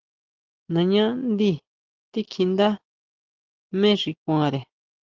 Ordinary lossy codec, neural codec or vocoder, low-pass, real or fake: Opus, 16 kbps; none; 7.2 kHz; real